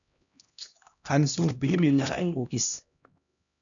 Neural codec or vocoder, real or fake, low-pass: codec, 16 kHz, 1 kbps, X-Codec, HuBERT features, trained on LibriSpeech; fake; 7.2 kHz